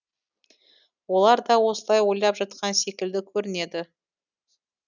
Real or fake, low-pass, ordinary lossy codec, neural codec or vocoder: real; 7.2 kHz; none; none